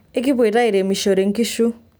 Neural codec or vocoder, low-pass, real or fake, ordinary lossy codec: none; none; real; none